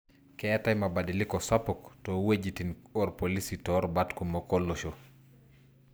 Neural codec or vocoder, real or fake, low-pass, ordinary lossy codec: none; real; none; none